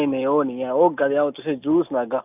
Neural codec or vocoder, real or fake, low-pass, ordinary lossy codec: none; real; 3.6 kHz; none